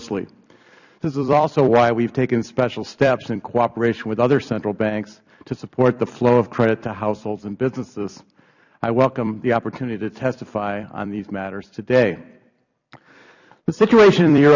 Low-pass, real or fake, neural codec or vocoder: 7.2 kHz; fake; vocoder, 44.1 kHz, 128 mel bands every 256 samples, BigVGAN v2